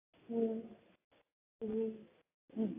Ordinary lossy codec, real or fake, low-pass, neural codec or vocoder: AAC, 24 kbps; real; 3.6 kHz; none